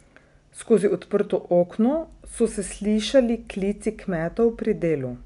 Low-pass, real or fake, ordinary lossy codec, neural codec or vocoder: 10.8 kHz; real; none; none